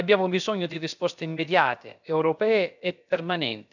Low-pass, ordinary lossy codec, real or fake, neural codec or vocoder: 7.2 kHz; none; fake; codec, 16 kHz, about 1 kbps, DyCAST, with the encoder's durations